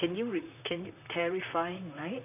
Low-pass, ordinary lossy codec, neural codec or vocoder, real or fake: 3.6 kHz; none; vocoder, 44.1 kHz, 128 mel bands, Pupu-Vocoder; fake